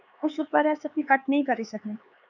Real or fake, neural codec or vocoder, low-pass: fake; codec, 16 kHz, 4 kbps, X-Codec, HuBERT features, trained on LibriSpeech; 7.2 kHz